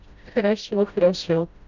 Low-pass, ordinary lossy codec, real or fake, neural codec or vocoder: 7.2 kHz; none; fake; codec, 16 kHz, 0.5 kbps, FreqCodec, smaller model